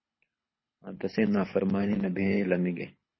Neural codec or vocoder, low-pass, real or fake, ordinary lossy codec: codec, 24 kHz, 6 kbps, HILCodec; 7.2 kHz; fake; MP3, 24 kbps